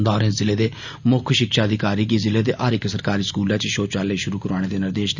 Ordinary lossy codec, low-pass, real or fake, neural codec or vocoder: none; 7.2 kHz; real; none